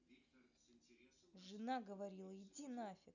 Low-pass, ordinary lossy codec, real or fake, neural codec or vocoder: 7.2 kHz; none; real; none